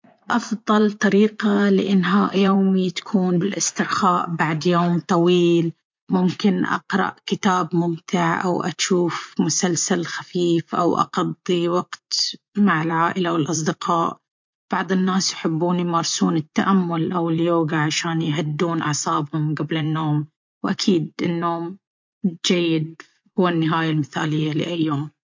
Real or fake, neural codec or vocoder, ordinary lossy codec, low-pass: fake; vocoder, 44.1 kHz, 80 mel bands, Vocos; MP3, 48 kbps; 7.2 kHz